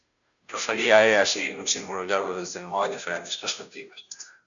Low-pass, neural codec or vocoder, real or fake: 7.2 kHz; codec, 16 kHz, 0.5 kbps, FunCodec, trained on Chinese and English, 25 frames a second; fake